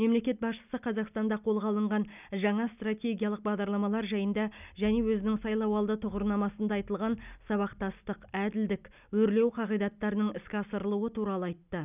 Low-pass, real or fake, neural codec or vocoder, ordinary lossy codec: 3.6 kHz; real; none; none